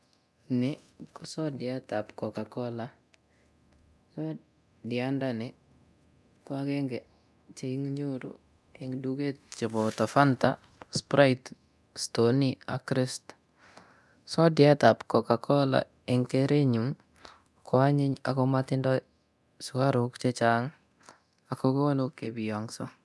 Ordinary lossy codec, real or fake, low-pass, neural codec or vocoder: none; fake; none; codec, 24 kHz, 0.9 kbps, DualCodec